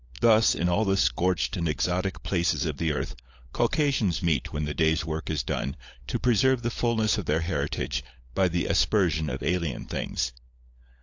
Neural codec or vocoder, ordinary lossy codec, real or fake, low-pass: codec, 16 kHz, 16 kbps, FunCodec, trained on LibriTTS, 50 frames a second; AAC, 48 kbps; fake; 7.2 kHz